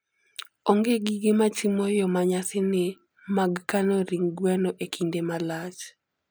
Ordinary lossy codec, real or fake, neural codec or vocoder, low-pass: none; real; none; none